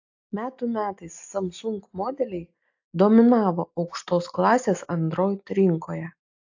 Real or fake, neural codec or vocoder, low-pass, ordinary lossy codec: real; none; 7.2 kHz; AAC, 48 kbps